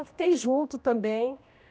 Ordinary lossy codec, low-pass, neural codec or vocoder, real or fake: none; none; codec, 16 kHz, 1 kbps, X-Codec, HuBERT features, trained on general audio; fake